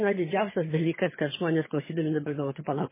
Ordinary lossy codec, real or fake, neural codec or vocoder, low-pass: MP3, 16 kbps; fake; vocoder, 22.05 kHz, 80 mel bands, HiFi-GAN; 3.6 kHz